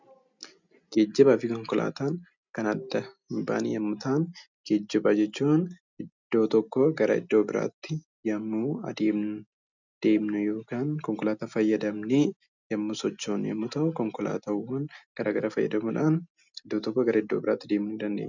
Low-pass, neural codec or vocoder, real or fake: 7.2 kHz; none; real